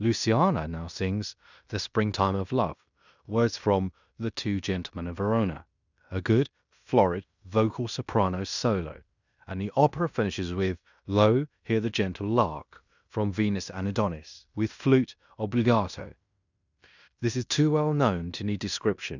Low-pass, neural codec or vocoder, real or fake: 7.2 kHz; codec, 16 kHz in and 24 kHz out, 0.9 kbps, LongCat-Audio-Codec, fine tuned four codebook decoder; fake